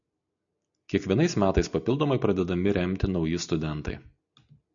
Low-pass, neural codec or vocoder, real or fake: 7.2 kHz; none; real